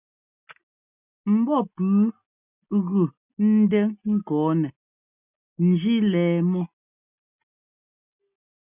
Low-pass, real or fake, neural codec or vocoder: 3.6 kHz; real; none